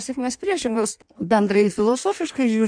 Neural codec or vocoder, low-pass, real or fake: codec, 16 kHz in and 24 kHz out, 1.1 kbps, FireRedTTS-2 codec; 9.9 kHz; fake